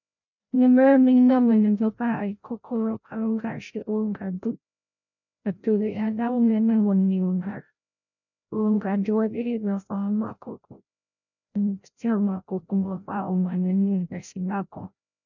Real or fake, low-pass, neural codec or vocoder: fake; 7.2 kHz; codec, 16 kHz, 0.5 kbps, FreqCodec, larger model